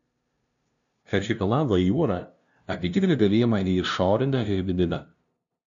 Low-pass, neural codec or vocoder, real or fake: 7.2 kHz; codec, 16 kHz, 0.5 kbps, FunCodec, trained on LibriTTS, 25 frames a second; fake